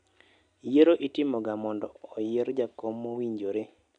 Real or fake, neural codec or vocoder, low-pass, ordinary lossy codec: real; none; 9.9 kHz; none